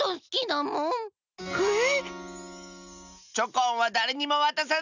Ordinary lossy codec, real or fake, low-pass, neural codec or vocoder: none; real; 7.2 kHz; none